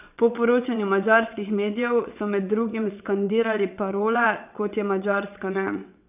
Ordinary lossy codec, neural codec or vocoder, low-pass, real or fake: none; vocoder, 22.05 kHz, 80 mel bands, WaveNeXt; 3.6 kHz; fake